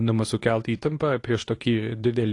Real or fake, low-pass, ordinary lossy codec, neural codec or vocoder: fake; 10.8 kHz; AAC, 48 kbps; codec, 24 kHz, 0.9 kbps, WavTokenizer, medium speech release version 2